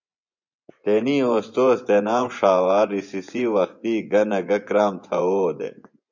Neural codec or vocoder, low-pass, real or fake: vocoder, 24 kHz, 100 mel bands, Vocos; 7.2 kHz; fake